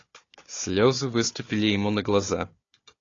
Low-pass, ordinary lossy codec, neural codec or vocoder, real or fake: 7.2 kHz; AAC, 32 kbps; codec, 16 kHz, 4 kbps, FunCodec, trained on Chinese and English, 50 frames a second; fake